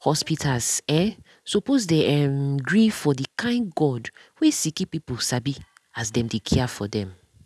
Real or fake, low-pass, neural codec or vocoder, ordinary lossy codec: real; none; none; none